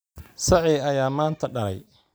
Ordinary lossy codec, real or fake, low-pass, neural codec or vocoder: none; real; none; none